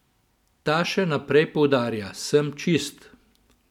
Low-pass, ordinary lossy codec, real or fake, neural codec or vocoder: 19.8 kHz; none; real; none